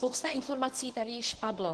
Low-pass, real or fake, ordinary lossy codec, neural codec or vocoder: 10.8 kHz; fake; Opus, 16 kbps; codec, 24 kHz, 0.9 kbps, WavTokenizer, medium speech release version 1